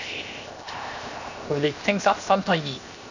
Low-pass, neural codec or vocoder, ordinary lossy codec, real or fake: 7.2 kHz; codec, 16 kHz, 0.7 kbps, FocalCodec; none; fake